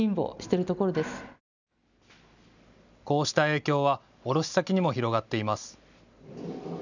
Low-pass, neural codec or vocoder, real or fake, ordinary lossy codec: 7.2 kHz; none; real; none